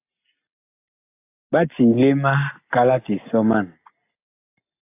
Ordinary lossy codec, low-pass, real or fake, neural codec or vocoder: AAC, 24 kbps; 3.6 kHz; real; none